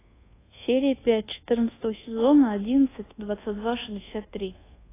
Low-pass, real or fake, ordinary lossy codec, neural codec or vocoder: 3.6 kHz; fake; AAC, 16 kbps; codec, 24 kHz, 1.2 kbps, DualCodec